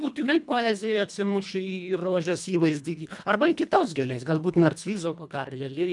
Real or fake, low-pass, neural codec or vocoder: fake; 10.8 kHz; codec, 24 kHz, 1.5 kbps, HILCodec